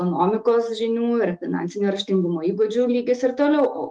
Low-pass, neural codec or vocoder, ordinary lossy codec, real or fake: 9.9 kHz; autoencoder, 48 kHz, 128 numbers a frame, DAC-VAE, trained on Japanese speech; Opus, 24 kbps; fake